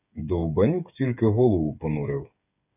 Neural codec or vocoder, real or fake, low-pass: codec, 16 kHz, 8 kbps, FreqCodec, smaller model; fake; 3.6 kHz